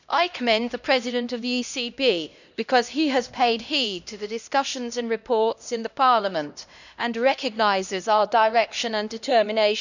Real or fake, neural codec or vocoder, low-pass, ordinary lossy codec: fake; codec, 16 kHz, 1 kbps, X-Codec, HuBERT features, trained on LibriSpeech; 7.2 kHz; none